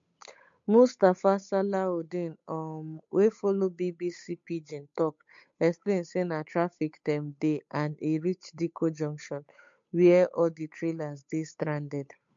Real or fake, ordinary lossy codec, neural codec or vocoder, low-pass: fake; MP3, 48 kbps; codec, 16 kHz, 8 kbps, FunCodec, trained on Chinese and English, 25 frames a second; 7.2 kHz